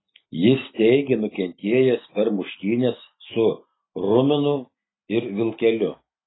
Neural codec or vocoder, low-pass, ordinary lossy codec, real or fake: none; 7.2 kHz; AAC, 16 kbps; real